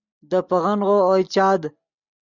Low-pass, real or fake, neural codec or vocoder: 7.2 kHz; real; none